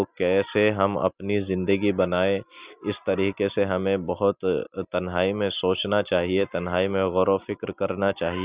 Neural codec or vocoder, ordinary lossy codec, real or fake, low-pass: none; Opus, 64 kbps; real; 3.6 kHz